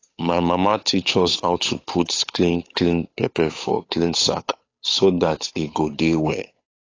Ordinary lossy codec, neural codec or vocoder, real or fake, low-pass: AAC, 32 kbps; codec, 16 kHz, 8 kbps, FunCodec, trained on Chinese and English, 25 frames a second; fake; 7.2 kHz